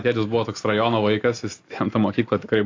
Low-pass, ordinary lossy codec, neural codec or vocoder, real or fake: 7.2 kHz; AAC, 48 kbps; none; real